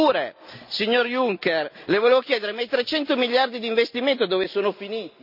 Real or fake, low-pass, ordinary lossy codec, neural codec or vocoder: real; 5.4 kHz; none; none